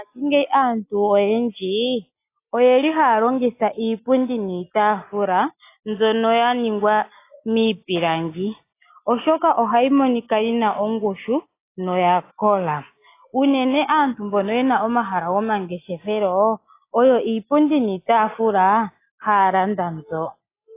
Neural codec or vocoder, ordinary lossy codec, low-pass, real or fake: none; AAC, 24 kbps; 3.6 kHz; real